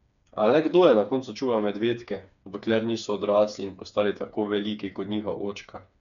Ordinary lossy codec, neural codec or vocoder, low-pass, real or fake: none; codec, 16 kHz, 4 kbps, FreqCodec, smaller model; 7.2 kHz; fake